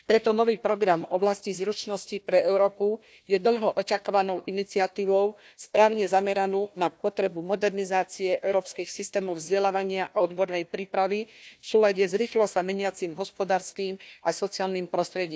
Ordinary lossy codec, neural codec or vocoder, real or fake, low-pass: none; codec, 16 kHz, 1 kbps, FunCodec, trained on Chinese and English, 50 frames a second; fake; none